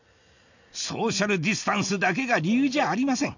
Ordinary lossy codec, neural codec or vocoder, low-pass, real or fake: none; none; 7.2 kHz; real